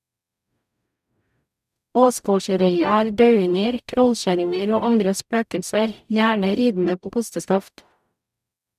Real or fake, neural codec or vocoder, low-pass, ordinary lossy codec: fake; codec, 44.1 kHz, 0.9 kbps, DAC; 14.4 kHz; none